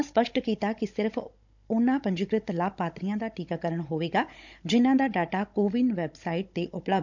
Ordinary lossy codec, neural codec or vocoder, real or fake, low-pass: none; codec, 16 kHz, 16 kbps, FunCodec, trained on Chinese and English, 50 frames a second; fake; 7.2 kHz